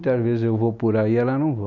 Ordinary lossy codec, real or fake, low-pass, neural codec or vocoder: none; real; 7.2 kHz; none